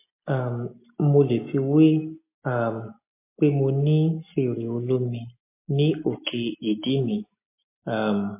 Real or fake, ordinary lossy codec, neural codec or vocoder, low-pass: real; MP3, 24 kbps; none; 3.6 kHz